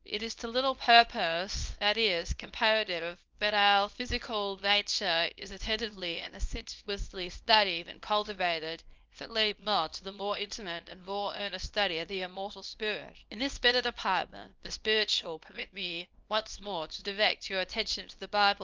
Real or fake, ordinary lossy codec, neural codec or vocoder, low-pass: fake; Opus, 32 kbps; codec, 24 kHz, 0.9 kbps, WavTokenizer, small release; 7.2 kHz